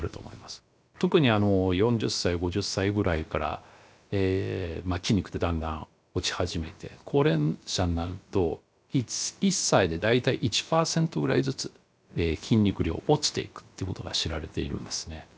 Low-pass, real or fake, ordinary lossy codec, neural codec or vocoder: none; fake; none; codec, 16 kHz, about 1 kbps, DyCAST, with the encoder's durations